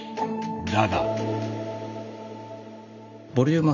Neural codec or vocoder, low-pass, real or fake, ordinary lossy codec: none; 7.2 kHz; real; none